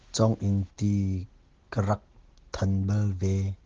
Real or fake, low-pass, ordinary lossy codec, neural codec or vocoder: real; 7.2 kHz; Opus, 16 kbps; none